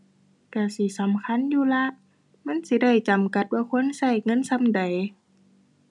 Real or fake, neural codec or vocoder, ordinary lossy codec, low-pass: real; none; none; 10.8 kHz